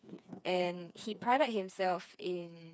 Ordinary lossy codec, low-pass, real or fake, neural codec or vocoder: none; none; fake; codec, 16 kHz, 4 kbps, FreqCodec, smaller model